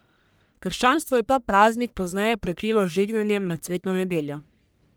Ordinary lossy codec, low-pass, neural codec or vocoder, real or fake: none; none; codec, 44.1 kHz, 1.7 kbps, Pupu-Codec; fake